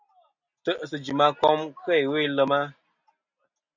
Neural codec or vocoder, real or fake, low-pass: none; real; 7.2 kHz